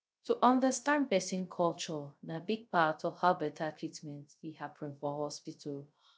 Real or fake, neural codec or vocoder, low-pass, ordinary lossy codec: fake; codec, 16 kHz, 0.3 kbps, FocalCodec; none; none